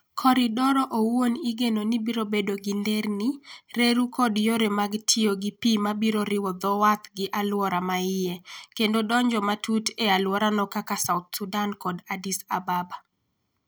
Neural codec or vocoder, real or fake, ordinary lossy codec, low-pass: none; real; none; none